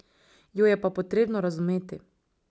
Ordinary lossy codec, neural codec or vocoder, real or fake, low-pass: none; none; real; none